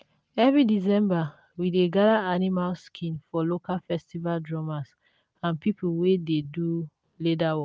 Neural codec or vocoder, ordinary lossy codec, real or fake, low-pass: none; none; real; none